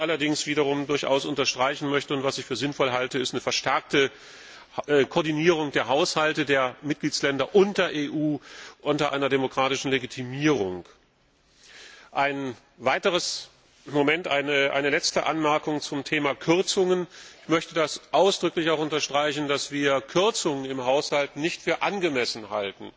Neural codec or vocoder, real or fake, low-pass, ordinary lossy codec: none; real; none; none